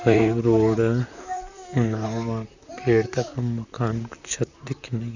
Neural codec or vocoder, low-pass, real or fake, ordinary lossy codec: vocoder, 44.1 kHz, 128 mel bands, Pupu-Vocoder; 7.2 kHz; fake; none